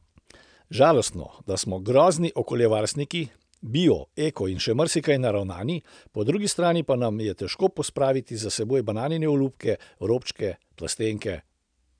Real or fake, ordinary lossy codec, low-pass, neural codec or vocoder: real; none; 9.9 kHz; none